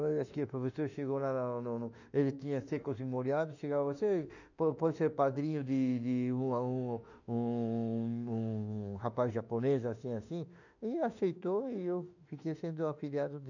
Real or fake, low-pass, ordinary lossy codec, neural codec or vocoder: fake; 7.2 kHz; none; autoencoder, 48 kHz, 32 numbers a frame, DAC-VAE, trained on Japanese speech